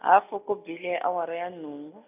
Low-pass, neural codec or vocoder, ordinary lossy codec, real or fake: 3.6 kHz; none; none; real